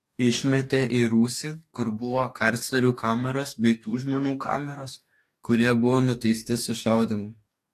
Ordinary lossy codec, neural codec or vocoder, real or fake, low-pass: AAC, 64 kbps; codec, 44.1 kHz, 2.6 kbps, DAC; fake; 14.4 kHz